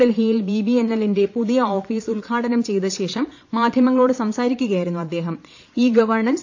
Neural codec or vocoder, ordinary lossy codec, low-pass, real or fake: vocoder, 22.05 kHz, 80 mel bands, Vocos; AAC, 48 kbps; 7.2 kHz; fake